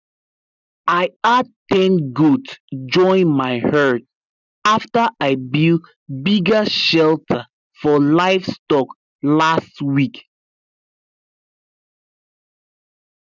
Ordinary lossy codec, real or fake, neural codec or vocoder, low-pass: none; real; none; 7.2 kHz